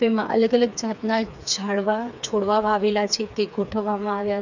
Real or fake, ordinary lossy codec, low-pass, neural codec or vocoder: fake; none; 7.2 kHz; codec, 16 kHz, 4 kbps, FreqCodec, smaller model